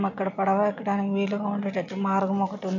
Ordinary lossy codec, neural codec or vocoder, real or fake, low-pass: none; none; real; 7.2 kHz